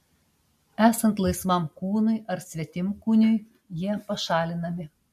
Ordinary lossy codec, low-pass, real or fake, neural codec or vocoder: MP3, 64 kbps; 14.4 kHz; real; none